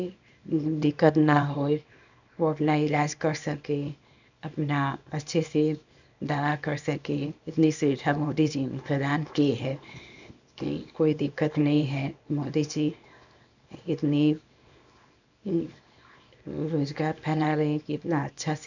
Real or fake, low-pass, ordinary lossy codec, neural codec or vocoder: fake; 7.2 kHz; none; codec, 24 kHz, 0.9 kbps, WavTokenizer, small release